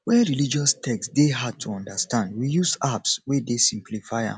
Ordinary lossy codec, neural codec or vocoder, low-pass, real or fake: none; none; 9.9 kHz; real